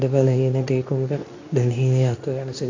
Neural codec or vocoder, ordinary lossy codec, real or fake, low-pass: codec, 24 kHz, 0.9 kbps, WavTokenizer, medium speech release version 2; AAC, 48 kbps; fake; 7.2 kHz